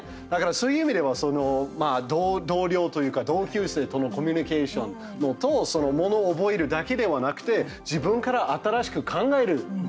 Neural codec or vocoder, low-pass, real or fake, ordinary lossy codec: none; none; real; none